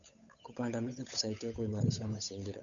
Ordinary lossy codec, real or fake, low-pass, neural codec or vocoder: none; fake; 7.2 kHz; codec, 16 kHz, 8 kbps, FunCodec, trained on Chinese and English, 25 frames a second